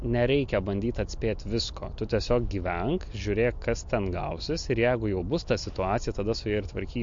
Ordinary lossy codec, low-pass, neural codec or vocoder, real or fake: MP3, 48 kbps; 7.2 kHz; none; real